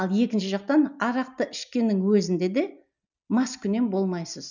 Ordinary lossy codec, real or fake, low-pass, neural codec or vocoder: none; real; 7.2 kHz; none